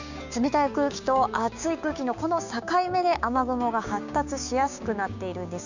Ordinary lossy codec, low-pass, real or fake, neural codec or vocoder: none; 7.2 kHz; fake; codec, 16 kHz, 6 kbps, DAC